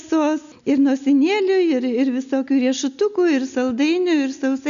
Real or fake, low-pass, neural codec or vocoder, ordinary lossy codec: real; 7.2 kHz; none; AAC, 96 kbps